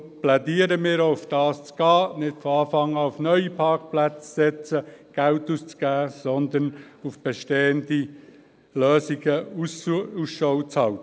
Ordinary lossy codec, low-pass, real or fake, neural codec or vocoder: none; none; real; none